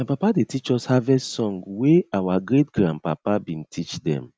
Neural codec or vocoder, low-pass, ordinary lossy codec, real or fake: none; none; none; real